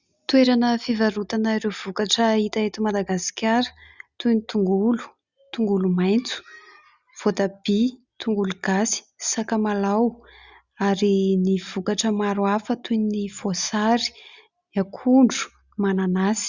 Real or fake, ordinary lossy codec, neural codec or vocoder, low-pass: real; Opus, 64 kbps; none; 7.2 kHz